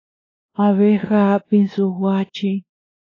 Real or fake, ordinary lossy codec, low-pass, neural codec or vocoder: fake; AAC, 32 kbps; 7.2 kHz; codec, 16 kHz, 2 kbps, X-Codec, WavLM features, trained on Multilingual LibriSpeech